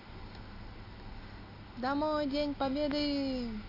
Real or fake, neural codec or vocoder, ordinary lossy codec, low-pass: real; none; AAC, 32 kbps; 5.4 kHz